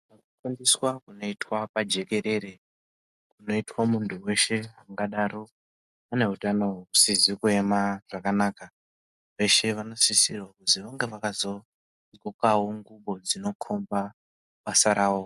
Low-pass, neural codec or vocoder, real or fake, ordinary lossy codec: 14.4 kHz; none; real; MP3, 96 kbps